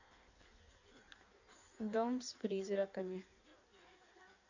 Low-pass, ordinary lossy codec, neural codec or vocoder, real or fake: 7.2 kHz; none; codec, 16 kHz in and 24 kHz out, 1.1 kbps, FireRedTTS-2 codec; fake